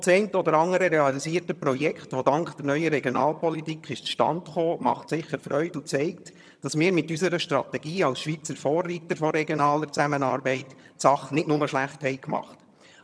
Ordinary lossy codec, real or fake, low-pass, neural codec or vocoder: none; fake; none; vocoder, 22.05 kHz, 80 mel bands, HiFi-GAN